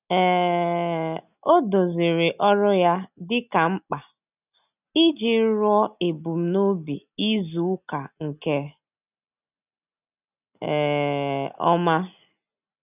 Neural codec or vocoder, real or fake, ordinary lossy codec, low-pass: none; real; none; 3.6 kHz